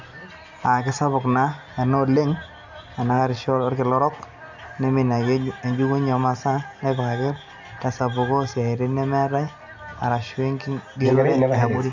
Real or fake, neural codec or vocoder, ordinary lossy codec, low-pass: real; none; MP3, 64 kbps; 7.2 kHz